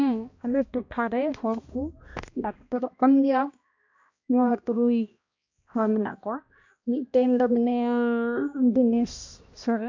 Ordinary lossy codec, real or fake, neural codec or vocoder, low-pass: AAC, 48 kbps; fake; codec, 16 kHz, 1 kbps, X-Codec, HuBERT features, trained on balanced general audio; 7.2 kHz